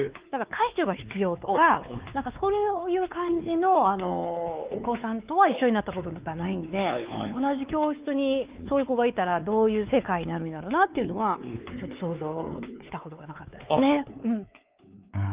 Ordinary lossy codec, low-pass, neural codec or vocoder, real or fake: Opus, 24 kbps; 3.6 kHz; codec, 16 kHz, 4 kbps, X-Codec, WavLM features, trained on Multilingual LibriSpeech; fake